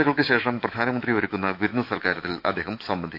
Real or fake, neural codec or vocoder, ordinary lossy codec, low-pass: fake; autoencoder, 48 kHz, 128 numbers a frame, DAC-VAE, trained on Japanese speech; AAC, 48 kbps; 5.4 kHz